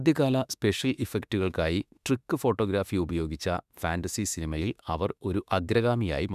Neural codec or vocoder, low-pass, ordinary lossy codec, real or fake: autoencoder, 48 kHz, 32 numbers a frame, DAC-VAE, trained on Japanese speech; 14.4 kHz; none; fake